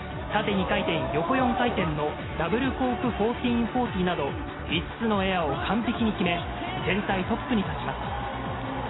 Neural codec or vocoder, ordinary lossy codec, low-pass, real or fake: none; AAC, 16 kbps; 7.2 kHz; real